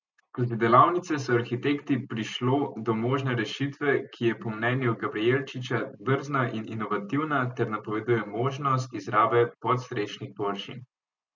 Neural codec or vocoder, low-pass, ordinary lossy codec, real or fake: none; 7.2 kHz; none; real